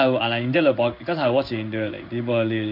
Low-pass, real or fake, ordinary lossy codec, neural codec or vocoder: 5.4 kHz; fake; none; codec, 16 kHz in and 24 kHz out, 1 kbps, XY-Tokenizer